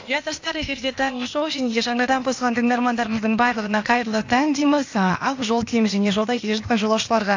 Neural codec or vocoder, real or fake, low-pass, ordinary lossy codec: codec, 16 kHz, 0.8 kbps, ZipCodec; fake; 7.2 kHz; AAC, 48 kbps